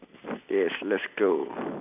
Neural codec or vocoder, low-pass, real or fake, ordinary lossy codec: none; 3.6 kHz; real; AAC, 24 kbps